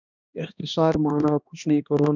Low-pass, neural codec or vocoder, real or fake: 7.2 kHz; codec, 16 kHz, 1 kbps, X-Codec, HuBERT features, trained on balanced general audio; fake